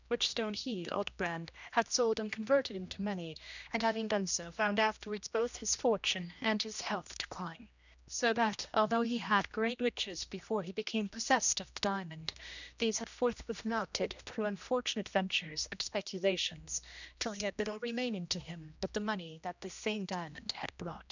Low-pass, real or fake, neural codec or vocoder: 7.2 kHz; fake; codec, 16 kHz, 1 kbps, X-Codec, HuBERT features, trained on general audio